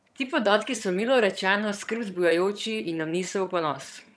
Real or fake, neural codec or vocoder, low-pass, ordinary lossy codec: fake; vocoder, 22.05 kHz, 80 mel bands, HiFi-GAN; none; none